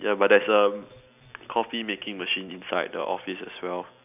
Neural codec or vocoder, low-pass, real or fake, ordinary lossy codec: none; 3.6 kHz; real; none